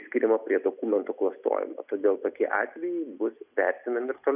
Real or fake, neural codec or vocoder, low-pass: real; none; 3.6 kHz